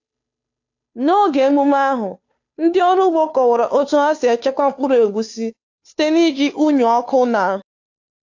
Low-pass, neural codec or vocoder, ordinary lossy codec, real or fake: 7.2 kHz; codec, 16 kHz, 2 kbps, FunCodec, trained on Chinese and English, 25 frames a second; AAC, 48 kbps; fake